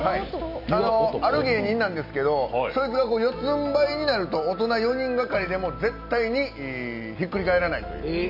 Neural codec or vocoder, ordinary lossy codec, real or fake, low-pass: none; none; real; 5.4 kHz